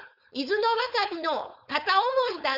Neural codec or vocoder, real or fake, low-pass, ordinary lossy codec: codec, 16 kHz, 4.8 kbps, FACodec; fake; 5.4 kHz; none